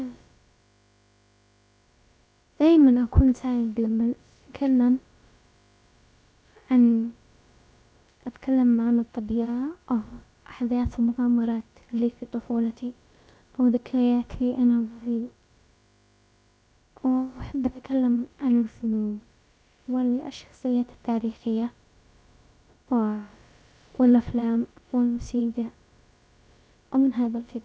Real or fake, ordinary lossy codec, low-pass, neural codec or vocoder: fake; none; none; codec, 16 kHz, about 1 kbps, DyCAST, with the encoder's durations